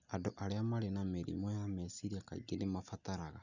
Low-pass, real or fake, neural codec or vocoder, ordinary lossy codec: 7.2 kHz; real; none; none